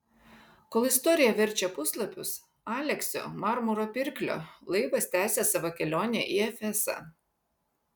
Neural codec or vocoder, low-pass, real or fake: none; 19.8 kHz; real